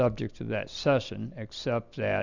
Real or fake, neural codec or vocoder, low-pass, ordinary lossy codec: real; none; 7.2 kHz; Opus, 64 kbps